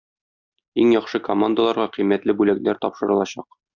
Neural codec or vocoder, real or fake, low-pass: none; real; 7.2 kHz